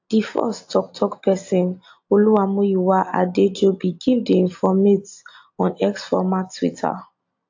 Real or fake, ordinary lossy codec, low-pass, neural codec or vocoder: real; AAC, 48 kbps; 7.2 kHz; none